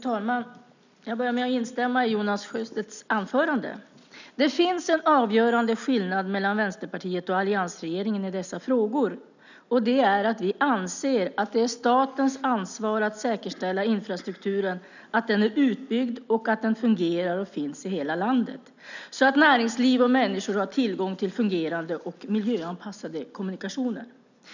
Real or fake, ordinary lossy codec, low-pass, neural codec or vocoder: real; none; 7.2 kHz; none